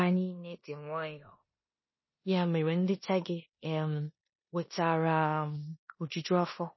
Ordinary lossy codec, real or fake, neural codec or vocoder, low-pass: MP3, 24 kbps; fake; codec, 16 kHz in and 24 kHz out, 0.9 kbps, LongCat-Audio-Codec, four codebook decoder; 7.2 kHz